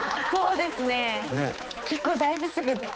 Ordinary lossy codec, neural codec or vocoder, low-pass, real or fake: none; codec, 16 kHz, 4 kbps, X-Codec, HuBERT features, trained on balanced general audio; none; fake